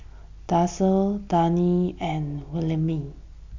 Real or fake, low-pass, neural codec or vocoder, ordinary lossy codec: real; 7.2 kHz; none; AAC, 48 kbps